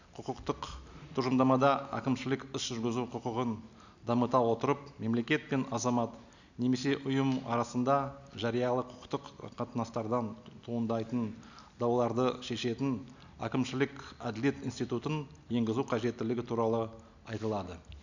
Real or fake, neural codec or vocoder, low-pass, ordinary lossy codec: real; none; 7.2 kHz; none